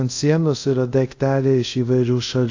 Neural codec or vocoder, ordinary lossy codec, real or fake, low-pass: codec, 24 kHz, 0.5 kbps, DualCodec; AAC, 48 kbps; fake; 7.2 kHz